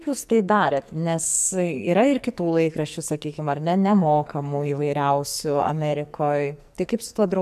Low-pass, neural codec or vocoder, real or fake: 14.4 kHz; codec, 44.1 kHz, 2.6 kbps, SNAC; fake